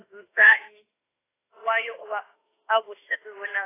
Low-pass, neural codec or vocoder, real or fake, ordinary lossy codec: 3.6 kHz; autoencoder, 48 kHz, 32 numbers a frame, DAC-VAE, trained on Japanese speech; fake; AAC, 16 kbps